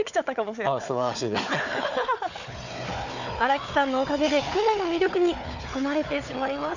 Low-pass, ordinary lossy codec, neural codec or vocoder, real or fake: 7.2 kHz; none; codec, 16 kHz, 4 kbps, FunCodec, trained on Chinese and English, 50 frames a second; fake